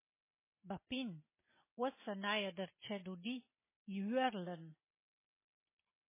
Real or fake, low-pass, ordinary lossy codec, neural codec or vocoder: real; 3.6 kHz; MP3, 16 kbps; none